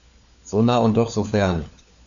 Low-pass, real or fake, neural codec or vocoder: 7.2 kHz; fake; codec, 16 kHz, 4 kbps, FunCodec, trained on LibriTTS, 50 frames a second